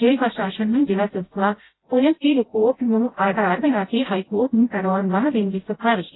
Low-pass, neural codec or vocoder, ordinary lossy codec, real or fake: 7.2 kHz; codec, 16 kHz, 0.5 kbps, FreqCodec, smaller model; AAC, 16 kbps; fake